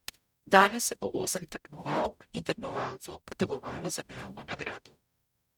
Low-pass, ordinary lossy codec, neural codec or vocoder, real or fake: 19.8 kHz; none; codec, 44.1 kHz, 0.9 kbps, DAC; fake